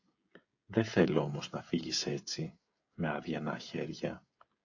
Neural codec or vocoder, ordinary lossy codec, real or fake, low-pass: codec, 16 kHz, 16 kbps, FreqCodec, smaller model; AAC, 48 kbps; fake; 7.2 kHz